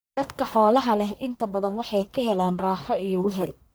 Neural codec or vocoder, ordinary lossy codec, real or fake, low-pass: codec, 44.1 kHz, 1.7 kbps, Pupu-Codec; none; fake; none